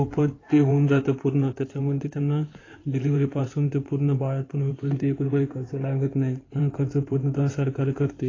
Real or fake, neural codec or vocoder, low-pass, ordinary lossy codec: fake; codec, 16 kHz in and 24 kHz out, 2.2 kbps, FireRedTTS-2 codec; 7.2 kHz; AAC, 32 kbps